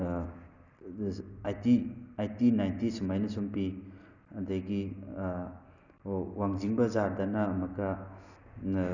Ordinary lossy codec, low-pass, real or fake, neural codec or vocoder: none; 7.2 kHz; real; none